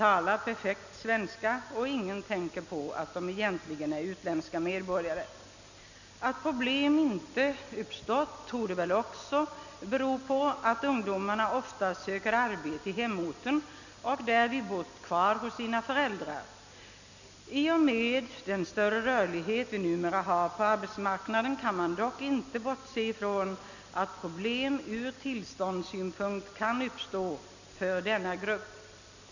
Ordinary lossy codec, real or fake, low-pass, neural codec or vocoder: none; real; 7.2 kHz; none